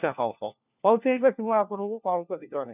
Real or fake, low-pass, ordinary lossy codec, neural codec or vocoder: fake; 3.6 kHz; none; codec, 16 kHz, 1 kbps, FunCodec, trained on LibriTTS, 50 frames a second